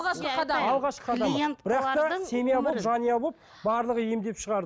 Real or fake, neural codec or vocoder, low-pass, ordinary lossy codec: real; none; none; none